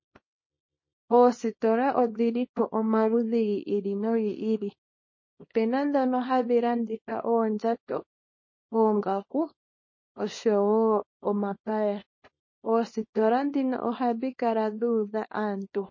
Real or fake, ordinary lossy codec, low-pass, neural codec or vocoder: fake; MP3, 32 kbps; 7.2 kHz; codec, 24 kHz, 0.9 kbps, WavTokenizer, small release